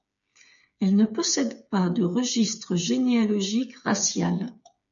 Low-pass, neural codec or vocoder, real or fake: 7.2 kHz; codec, 16 kHz, 8 kbps, FreqCodec, smaller model; fake